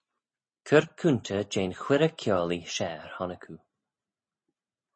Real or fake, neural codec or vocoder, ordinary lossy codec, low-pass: real; none; MP3, 32 kbps; 9.9 kHz